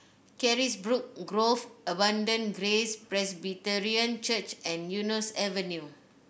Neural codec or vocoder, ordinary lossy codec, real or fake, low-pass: none; none; real; none